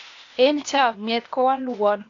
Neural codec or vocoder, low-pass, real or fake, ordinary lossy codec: codec, 16 kHz, 0.8 kbps, ZipCodec; 7.2 kHz; fake; AAC, 48 kbps